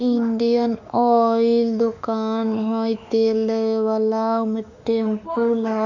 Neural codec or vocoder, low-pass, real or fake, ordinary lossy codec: autoencoder, 48 kHz, 32 numbers a frame, DAC-VAE, trained on Japanese speech; 7.2 kHz; fake; none